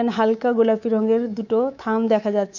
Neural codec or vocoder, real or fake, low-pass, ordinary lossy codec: codec, 24 kHz, 3.1 kbps, DualCodec; fake; 7.2 kHz; none